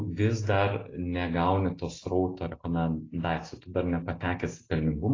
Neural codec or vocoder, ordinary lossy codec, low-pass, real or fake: none; AAC, 32 kbps; 7.2 kHz; real